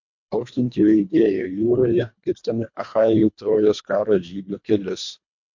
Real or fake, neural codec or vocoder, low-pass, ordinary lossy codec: fake; codec, 24 kHz, 1.5 kbps, HILCodec; 7.2 kHz; MP3, 48 kbps